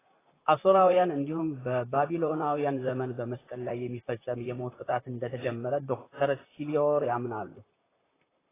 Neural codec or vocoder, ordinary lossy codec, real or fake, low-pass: vocoder, 44.1 kHz, 128 mel bands, Pupu-Vocoder; AAC, 16 kbps; fake; 3.6 kHz